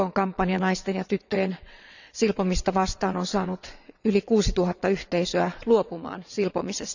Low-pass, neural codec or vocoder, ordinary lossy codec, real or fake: 7.2 kHz; vocoder, 22.05 kHz, 80 mel bands, WaveNeXt; none; fake